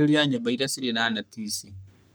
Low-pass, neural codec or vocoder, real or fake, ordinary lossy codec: none; codec, 44.1 kHz, 3.4 kbps, Pupu-Codec; fake; none